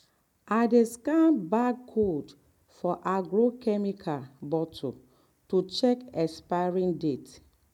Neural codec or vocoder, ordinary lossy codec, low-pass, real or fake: none; MP3, 96 kbps; 19.8 kHz; real